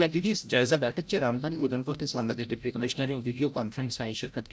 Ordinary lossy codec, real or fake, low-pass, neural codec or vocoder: none; fake; none; codec, 16 kHz, 0.5 kbps, FreqCodec, larger model